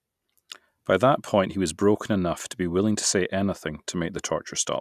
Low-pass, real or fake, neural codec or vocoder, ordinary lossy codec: 14.4 kHz; real; none; none